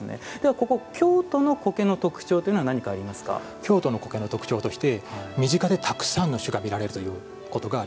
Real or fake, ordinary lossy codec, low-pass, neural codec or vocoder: real; none; none; none